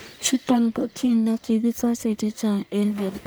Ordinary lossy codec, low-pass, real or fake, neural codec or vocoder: none; none; fake; codec, 44.1 kHz, 1.7 kbps, Pupu-Codec